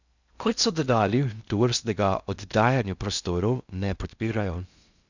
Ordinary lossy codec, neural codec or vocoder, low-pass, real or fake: none; codec, 16 kHz in and 24 kHz out, 0.6 kbps, FocalCodec, streaming, 2048 codes; 7.2 kHz; fake